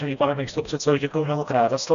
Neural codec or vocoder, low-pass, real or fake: codec, 16 kHz, 1 kbps, FreqCodec, smaller model; 7.2 kHz; fake